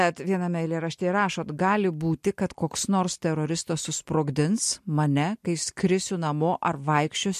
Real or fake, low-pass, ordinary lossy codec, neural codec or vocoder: real; 14.4 kHz; MP3, 64 kbps; none